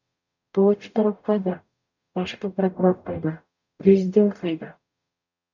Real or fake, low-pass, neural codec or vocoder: fake; 7.2 kHz; codec, 44.1 kHz, 0.9 kbps, DAC